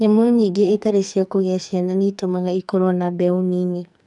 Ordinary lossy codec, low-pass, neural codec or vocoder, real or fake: none; 10.8 kHz; codec, 44.1 kHz, 2.6 kbps, SNAC; fake